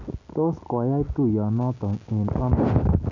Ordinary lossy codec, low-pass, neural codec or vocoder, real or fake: none; 7.2 kHz; none; real